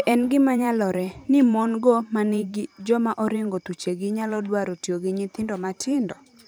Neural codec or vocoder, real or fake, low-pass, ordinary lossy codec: vocoder, 44.1 kHz, 128 mel bands every 512 samples, BigVGAN v2; fake; none; none